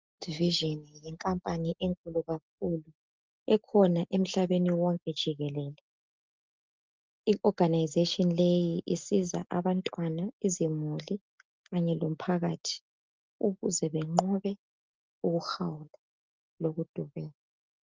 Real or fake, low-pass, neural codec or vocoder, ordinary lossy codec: real; 7.2 kHz; none; Opus, 32 kbps